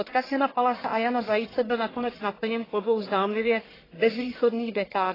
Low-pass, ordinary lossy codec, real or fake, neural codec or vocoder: 5.4 kHz; AAC, 24 kbps; fake; codec, 44.1 kHz, 1.7 kbps, Pupu-Codec